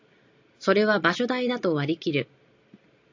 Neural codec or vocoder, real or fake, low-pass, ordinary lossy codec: none; real; 7.2 kHz; AAC, 48 kbps